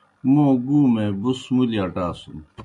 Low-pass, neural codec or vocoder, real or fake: 10.8 kHz; none; real